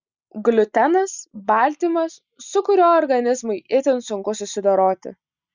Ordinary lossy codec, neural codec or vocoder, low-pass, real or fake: Opus, 64 kbps; none; 7.2 kHz; real